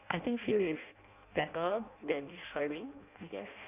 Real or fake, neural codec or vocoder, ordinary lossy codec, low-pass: fake; codec, 16 kHz in and 24 kHz out, 0.6 kbps, FireRedTTS-2 codec; none; 3.6 kHz